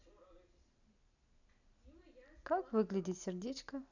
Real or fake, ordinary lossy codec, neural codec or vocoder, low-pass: real; none; none; 7.2 kHz